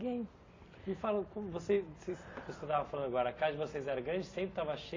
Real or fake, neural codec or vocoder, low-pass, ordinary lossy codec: real; none; 7.2 kHz; AAC, 32 kbps